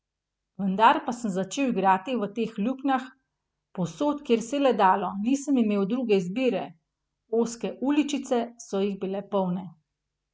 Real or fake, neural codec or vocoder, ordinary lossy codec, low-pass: real; none; none; none